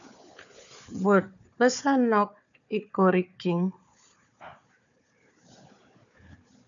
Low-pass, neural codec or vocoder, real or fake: 7.2 kHz; codec, 16 kHz, 4 kbps, FunCodec, trained on Chinese and English, 50 frames a second; fake